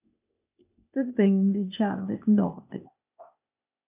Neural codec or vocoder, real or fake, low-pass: codec, 16 kHz, 0.8 kbps, ZipCodec; fake; 3.6 kHz